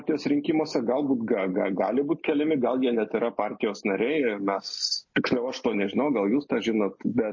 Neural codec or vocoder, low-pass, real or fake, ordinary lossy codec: none; 7.2 kHz; real; MP3, 32 kbps